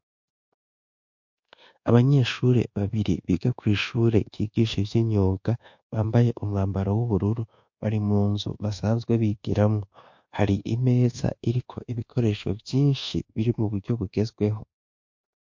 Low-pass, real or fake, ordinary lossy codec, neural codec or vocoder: 7.2 kHz; fake; MP3, 48 kbps; codec, 24 kHz, 1.2 kbps, DualCodec